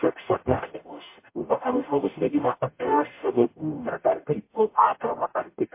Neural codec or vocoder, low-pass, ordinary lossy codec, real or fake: codec, 44.1 kHz, 0.9 kbps, DAC; 3.6 kHz; MP3, 24 kbps; fake